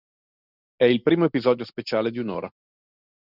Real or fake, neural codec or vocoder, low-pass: real; none; 5.4 kHz